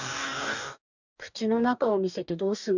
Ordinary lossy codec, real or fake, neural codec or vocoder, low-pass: none; fake; codec, 44.1 kHz, 2.6 kbps, DAC; 7.2 kHz